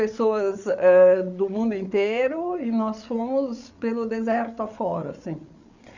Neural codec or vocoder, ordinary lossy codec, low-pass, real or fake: codec, 16 kHz, 8 kbps, FreqCodec, larger model; none; 7.2 kHz; fake